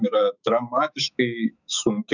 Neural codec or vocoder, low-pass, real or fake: autoencoder, 48 kHz, 128 numbers a frame, DAC-VAE, trained on Japanese speech; 7.2 kHz; fake